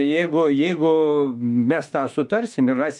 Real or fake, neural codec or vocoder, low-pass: fake; autoencoder, 48 kHz, 32 numbers a frame, DAC-VAE, trained on Japanese speech; 10.8 kHz